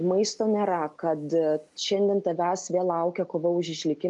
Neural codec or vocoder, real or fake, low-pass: none; real; 10.8 kHz